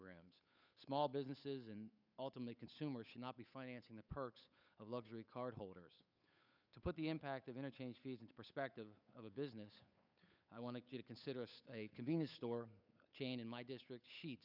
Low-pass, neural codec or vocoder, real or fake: 5.4 kHz; codec, 16 kHz, 8 kbps, FunCodec, trained on Chinese and English, 25 frames a second; fake